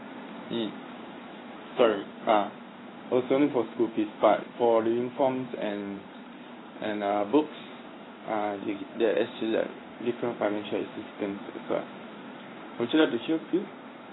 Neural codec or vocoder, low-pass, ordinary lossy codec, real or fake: codec, 16 kHz in and 24 kHz out, 1 kbps, XY-Tokenizer; 7.2 kHz; AAC, 16 kbps; fake